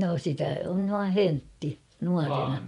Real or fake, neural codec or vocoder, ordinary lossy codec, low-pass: fake; vocoder, 24 kHz, 100 mel bands, Vocos; none; 10.8 kHz